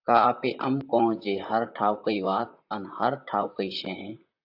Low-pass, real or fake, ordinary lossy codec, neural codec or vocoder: 5.4 kHz; fake; AAC, 48 kbps; vocoder, 44.1 kHz, 128 mel bands, Pupu-Vocoder